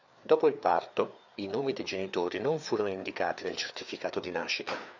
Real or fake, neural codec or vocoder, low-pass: fake; codec, 16 kHz, 4 kbps, FreqCodec, larger model; 7.2 kHz